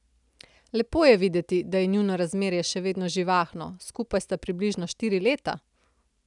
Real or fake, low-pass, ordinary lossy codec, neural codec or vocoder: real; 10.8 kHz; none; none